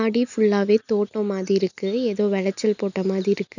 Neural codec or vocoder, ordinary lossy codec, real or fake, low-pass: none; none; real; 7.2 kHz